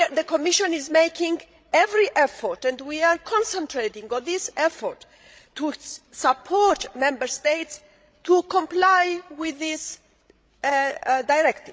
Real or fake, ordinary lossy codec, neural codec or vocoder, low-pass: fake; none; codec, 16 kHz, 16 kbps, FreqCodec, larger model; none